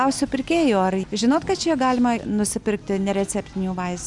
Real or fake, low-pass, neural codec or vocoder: real; 10.8 kHz; none